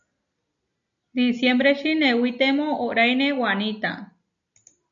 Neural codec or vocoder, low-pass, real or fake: none; 7.2 kHz; real